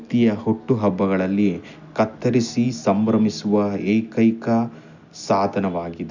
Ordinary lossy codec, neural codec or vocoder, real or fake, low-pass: none; none; real; 7.2 kHz